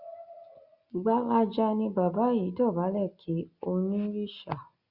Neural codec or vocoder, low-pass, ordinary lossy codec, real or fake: none; 5.4 kHz; Opus, 64 kbps; real